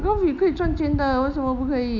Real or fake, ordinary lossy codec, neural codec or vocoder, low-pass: real; none; none; 7.2 kHz